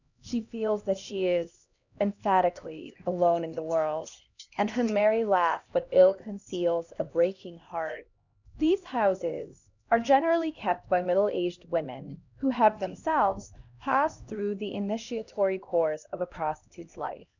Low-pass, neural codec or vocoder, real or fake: 7.2 kHz; codec, 16 kHz, 1 kbps, X-Codec, HuBERT features, trained on LibriSpeech; fake